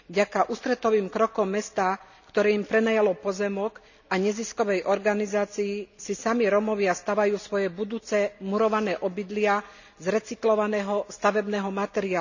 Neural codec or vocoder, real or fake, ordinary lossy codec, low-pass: none; real; none; 7.2 kHz